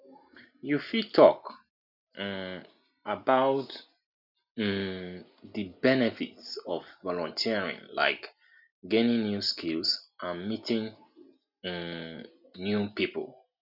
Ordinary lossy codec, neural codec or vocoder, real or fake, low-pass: none; none; real; 5.4 kHz